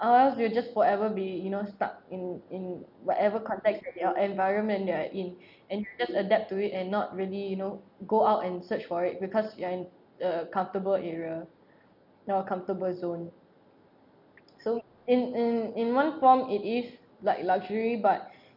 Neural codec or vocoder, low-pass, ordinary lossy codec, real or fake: none; 5.4 kHz; none; real